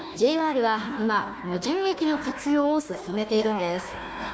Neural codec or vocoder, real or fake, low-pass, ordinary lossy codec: codec, 16 kHz, 1 kbps, FunCodec, trained on Chinese and English, 50 frames a second; fake; none; none